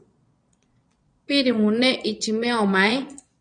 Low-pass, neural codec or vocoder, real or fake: 9.9 kHz; vocoder, 22.05 kHz, 80 mel bands, Vocos; fake